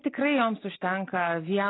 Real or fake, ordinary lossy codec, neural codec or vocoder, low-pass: real; AAC, 16 kbps; none; 7.2 kHz